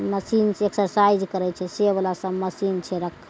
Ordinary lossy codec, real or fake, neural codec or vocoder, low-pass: none; real; none; none